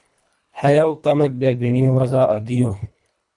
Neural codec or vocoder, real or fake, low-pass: codec, 24 kHz, 1.5 kbps, HILCodec; fake; 10.8 kHz